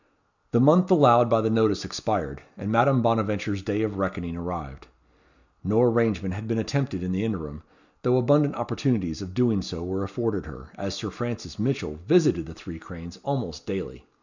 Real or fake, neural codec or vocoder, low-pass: real; none; 7.2 kHz